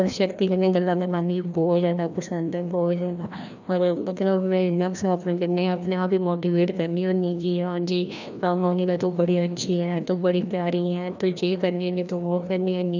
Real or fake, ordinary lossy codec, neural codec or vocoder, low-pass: fake; none; codec, 16 kHz, 1 kbps, FreqCodec, larger model; 7.2 kHz